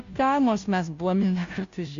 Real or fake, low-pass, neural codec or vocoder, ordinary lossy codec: fake; 7.2 kHz; codec, 16 kHz, 0.5 kbps, FunCodec, trained on Chinese and English, 25 frames a second; MP3, 64 kbps